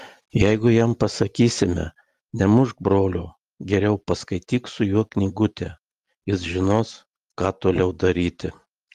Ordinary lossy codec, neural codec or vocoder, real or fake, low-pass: Opus, 24 kbps; none; real; 14.4 kHz